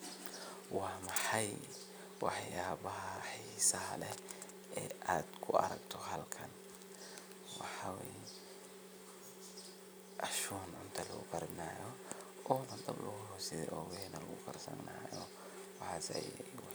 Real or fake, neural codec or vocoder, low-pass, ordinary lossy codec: real; none; none; none